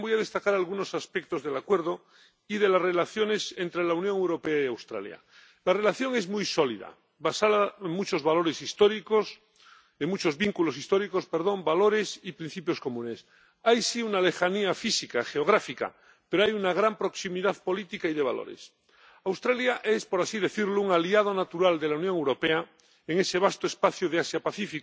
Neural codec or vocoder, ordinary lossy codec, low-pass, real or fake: none; none; none; real